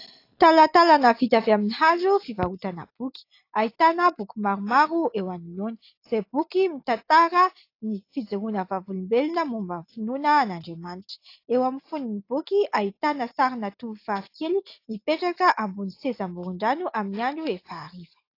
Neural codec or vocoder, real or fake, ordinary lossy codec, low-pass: none; real; AAC, 32 kbps; 5.4 kHz